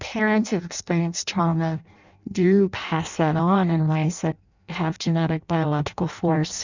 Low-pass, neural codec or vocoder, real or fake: 7.2 kHz; codec, 16 kHz in and 24 kHz out, 0.6 kbps, FireRedTTS-2 codec; fake